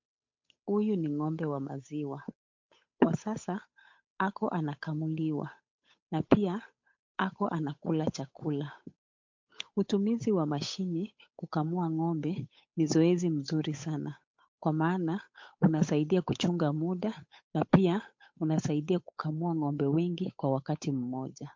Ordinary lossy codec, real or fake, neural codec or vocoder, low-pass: MP3, 48 kbps; fake; codec, 16 kHz, 8 kbps, FunCodec, trained on Chinese and English, 25 frames a second; 7.2 kHz